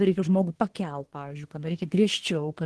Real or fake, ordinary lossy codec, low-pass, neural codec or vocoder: fake; Opus, 16 kbps; 10.8 kHz; codec, 24 kHz, 1 kbps, SNAC